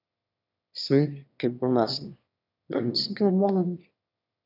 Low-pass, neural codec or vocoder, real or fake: 5.4 kHz; autoencoder, 22.05 kHz, a latent of 192 numbers a frame, VITS, trained on one speaker; fake